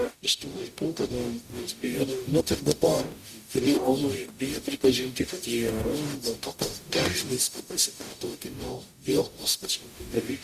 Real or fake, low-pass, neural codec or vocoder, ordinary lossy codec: fake; 14.4 kHz; codec, 44.1 kHz, 0.9 kbps, DAC; Opus, 64 kbps